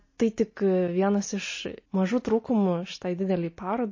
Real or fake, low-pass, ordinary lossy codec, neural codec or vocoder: real; 7.2 kHz; MP3, 32 kbps; none